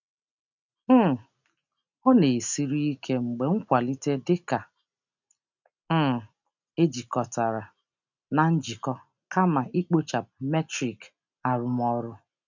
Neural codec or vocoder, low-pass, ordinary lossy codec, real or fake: none; 7.2 kHz; none; real